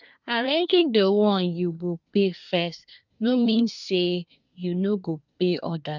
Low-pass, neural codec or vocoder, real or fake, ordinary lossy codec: 7.2 kHz; codec, 24 kHz, 1 kbps, SNAC; fake; none